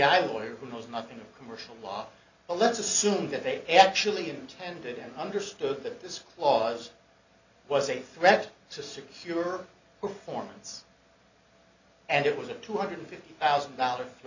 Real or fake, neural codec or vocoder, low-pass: real; none; 7.2 kHz